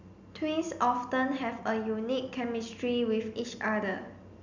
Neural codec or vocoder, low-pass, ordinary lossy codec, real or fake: none; 7.2 kHz; none; real